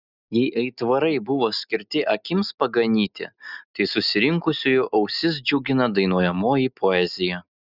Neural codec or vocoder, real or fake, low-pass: none; real; 5.4 kHz